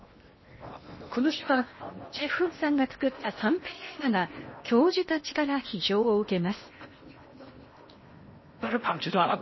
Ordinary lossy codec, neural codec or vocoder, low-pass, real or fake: MP3, 24 kbps; codec, 16 kHz in and 24 kHz out, 0.8 kbps, FocalCodec, streaming, 65536 codes; 7.2 kHz; fake